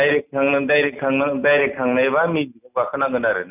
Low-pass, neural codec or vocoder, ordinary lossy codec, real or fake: 3.6 kHz; none; none; real